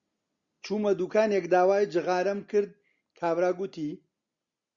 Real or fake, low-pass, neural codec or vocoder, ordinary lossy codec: real; 7.2 kHz; none; Opus, 64 kbps